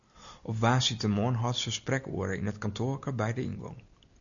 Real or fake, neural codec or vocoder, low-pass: real; none; 7.2 kHz